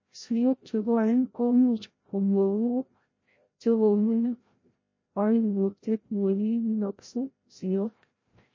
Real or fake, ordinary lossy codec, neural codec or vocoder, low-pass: fake; MP3, 32 kbps; codec, 16 kHz, 0.5 kbps, FreqCodec, larger model; 7.2 kHz